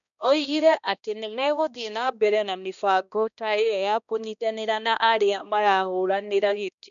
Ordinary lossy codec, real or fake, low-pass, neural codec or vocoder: none; fake; 7.2 kHz; codec, 16 kHz, 1 kbps, X-Codec, HuBERT features, trained on balanced general audio